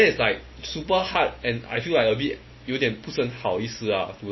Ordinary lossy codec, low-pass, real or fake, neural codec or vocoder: MP3, 24 kbps; 7.2 kHz; real; none